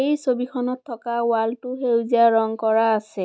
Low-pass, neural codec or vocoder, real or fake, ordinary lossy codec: none; none; real; none